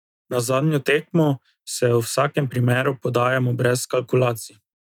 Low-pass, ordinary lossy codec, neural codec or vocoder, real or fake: 19.8 kHz; none; none; real